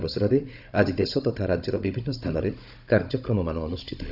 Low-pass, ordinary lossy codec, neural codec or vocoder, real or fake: 5.4 kHz; none; codec, 16 kHz, 16 kbps, FunCodec, trained on Chinese and English, 50 frames a second; fake